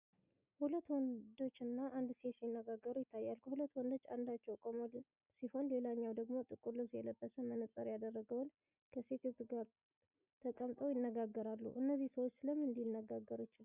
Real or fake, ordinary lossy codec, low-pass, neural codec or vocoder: real; Opus, 64 kbps; 3.6 kHz; none